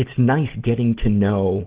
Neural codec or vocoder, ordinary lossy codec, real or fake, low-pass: none; Opus, 16 kbps; real; 3.6 kHz